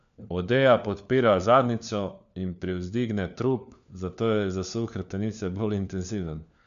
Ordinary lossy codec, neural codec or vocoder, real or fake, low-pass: none; codec, 16 kHz, 4 kbps, FunCodec, trained on LibriTTS, 50 frames a second; fake; 7.2 kHz